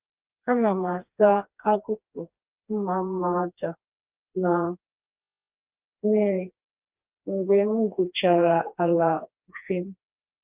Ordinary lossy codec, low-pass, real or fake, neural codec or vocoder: Opus, 24 kbps; 3.6 kHz; fake; codec, 16 kHz, 2 kbps, FreqCodec, smaller model